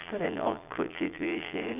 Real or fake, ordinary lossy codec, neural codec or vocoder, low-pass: fake; none; vocoder, 22.05 kHz, 80 mel bands, Vocos; 3.6 kHz